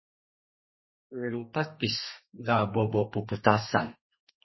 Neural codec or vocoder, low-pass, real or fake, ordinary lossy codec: codec, 32 kHz, 1.9 kbps, SNAC; 7.2 kHz; fake; MP3, 24 kbps